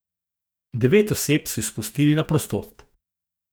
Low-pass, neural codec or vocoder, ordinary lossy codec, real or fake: none; codec, 44.1 kHz, 2.6 kbps, DAC; none; fake